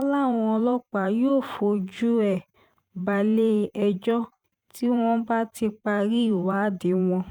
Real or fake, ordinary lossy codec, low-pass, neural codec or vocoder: fake; none; 19.8 kHz; vocoder, 44.1 kHz, 128 mel bands every 512 samples, BigVGAN v2